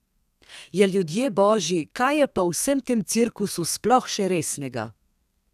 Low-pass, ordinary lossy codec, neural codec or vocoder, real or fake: 14.4 kHz; none; codec, 32 kHz, 1.9 kbps, SNAC; fake